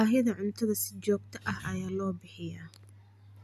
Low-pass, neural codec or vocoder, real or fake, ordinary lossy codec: 14.4 kHz; none; real; none